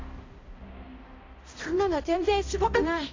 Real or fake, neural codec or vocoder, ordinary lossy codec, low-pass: fake; codec, 16 kHz, 0.5 kbps, X-Codec, HuBERT features, trained on balanced general audio; AAC, 32 kbps; 7.2 kHz